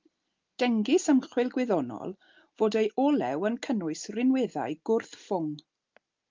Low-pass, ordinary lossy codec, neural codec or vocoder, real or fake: 7.2 kHz; Opus, 32 kbps; none; real